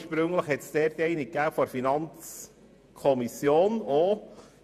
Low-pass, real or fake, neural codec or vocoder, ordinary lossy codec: 14.4 kHz; fake; vocoder, 48 kHz, 128 mel bands, Vocos; MP3, 96 kbps